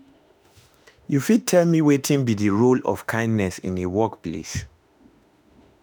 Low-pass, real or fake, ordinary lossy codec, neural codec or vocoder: none; fake; none; autoencoder, 48 kHz, 32 numbers a frame, DAC-VAE, trained on Japanese speech